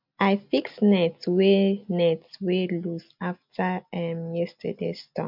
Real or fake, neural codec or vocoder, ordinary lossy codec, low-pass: real; none; MP3, 48 kbps; 5.4 kHz